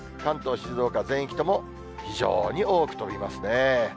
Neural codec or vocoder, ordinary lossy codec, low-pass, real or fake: none; none; none; real